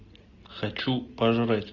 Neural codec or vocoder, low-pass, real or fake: none; 7.2 kHz; real